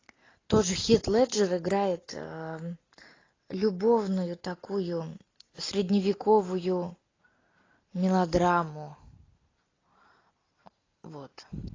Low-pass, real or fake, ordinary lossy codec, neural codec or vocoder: 7.2 kHz; real; AAC, 32 kbps; none